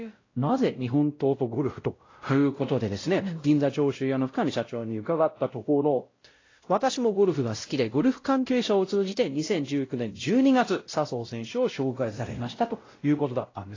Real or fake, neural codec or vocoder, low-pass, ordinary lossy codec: fake; codec, 16 kHz, 0.5 kbps, X-Codec, WavLM features, trained on Multilingual LibriSpeech; 7.2 kHz; AAC, 32 kbps